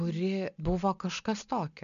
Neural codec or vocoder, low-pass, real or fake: none; 7.2 kHz; real